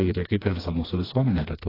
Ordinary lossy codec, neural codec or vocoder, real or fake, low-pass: AAC, 24 kbps; codec, 16 kHz, 2 kbps, FreqCodec, smaller model; fake; 5.4 kHz